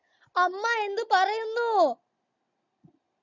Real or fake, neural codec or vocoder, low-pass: real; none; 7.2 kHz